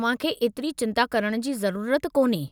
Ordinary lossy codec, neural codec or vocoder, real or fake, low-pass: none; none; real; none